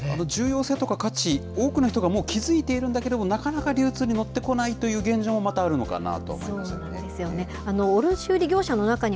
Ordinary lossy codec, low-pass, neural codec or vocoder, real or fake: none; none; none; real